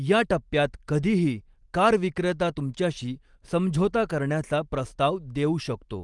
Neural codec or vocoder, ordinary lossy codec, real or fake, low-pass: none; Opus, 24 kbps; real; 10.8 kHz